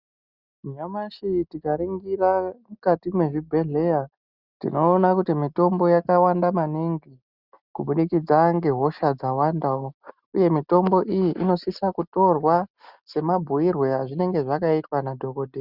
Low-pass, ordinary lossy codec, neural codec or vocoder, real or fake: 5.4 kHz; Opus, 64 kbps; none; real